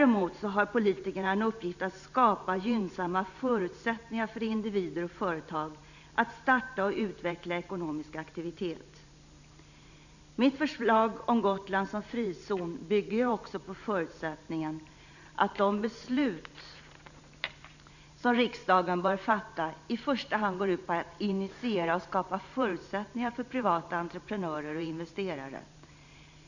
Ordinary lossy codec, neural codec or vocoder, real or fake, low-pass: none; vocoder, 44.1 kHz, 128 mel bands every 512 samples, BigVGAN v2; fake; 7.2 kHz